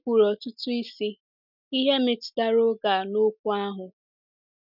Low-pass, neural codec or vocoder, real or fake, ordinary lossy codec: 5.4 kHz; none; real; none